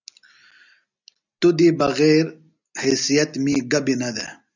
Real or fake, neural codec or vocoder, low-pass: real; none; 7.2 kHz